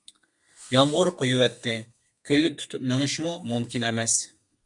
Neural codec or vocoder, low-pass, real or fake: codec, 32 kHz, 1.9 kbps, SNAC; 10.8 kHz; fake